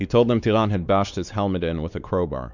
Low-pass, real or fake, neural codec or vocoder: 7.2 kHz; fake; codec, 16 kHz, 4 kbps, X-Codec, WavLM features, trained on Multilingual LibriSpeech